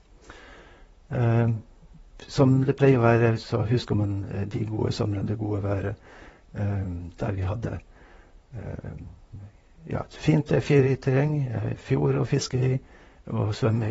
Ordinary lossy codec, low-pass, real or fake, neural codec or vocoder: AAC, 24 kbps; 19.8 kHz; fake; vocoder, 44.1 kHz, 128 mel bands, Pupu-Vocoder